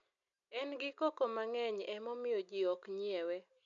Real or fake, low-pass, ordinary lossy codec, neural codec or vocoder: real; 7.2 kHz; none; none